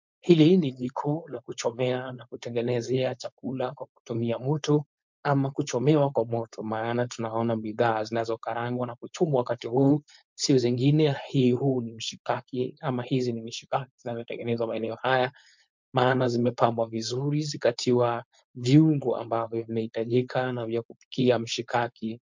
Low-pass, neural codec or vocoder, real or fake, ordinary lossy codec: 7.2 kHz; codec, 16 kHz, 4.8 kbps, FACodec; fake; MP3, 64 kbps